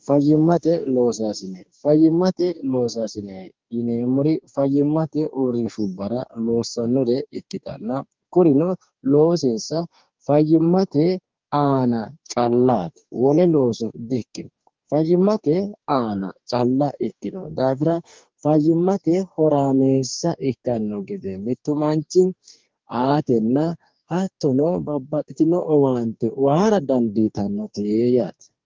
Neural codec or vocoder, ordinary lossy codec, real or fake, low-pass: codec, 44.1 kHz, 2.6 kbps, DAC; Opus, 24 kbps; fake; 7.2 kHz